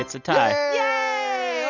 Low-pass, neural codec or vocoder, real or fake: 7.2 kHz; none; real